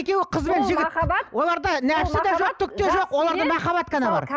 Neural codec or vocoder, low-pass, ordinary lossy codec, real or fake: none; none; none; real